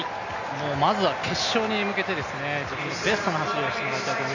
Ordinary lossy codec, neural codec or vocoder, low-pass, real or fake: none; none; 7.2 kHz; real